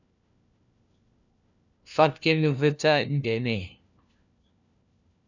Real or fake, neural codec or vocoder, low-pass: fake; codec, 16 kHz, 1 kbps, FunCodec, trained on LibriTTS, 50 frames a second; 7.2 kHz